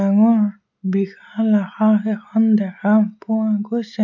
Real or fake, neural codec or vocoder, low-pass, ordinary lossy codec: real; none; 7.2 kHz; none